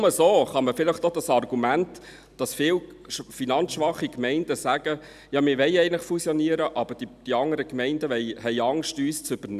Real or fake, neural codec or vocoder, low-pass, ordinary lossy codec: real; none; 14.4 kHz; none